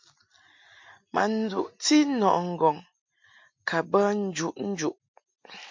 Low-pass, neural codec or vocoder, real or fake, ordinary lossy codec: 7.2 kHz; none; real; MP3, 48 kbps